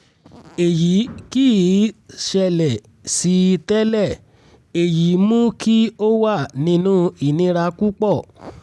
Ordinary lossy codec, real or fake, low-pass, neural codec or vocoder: none; real; none; none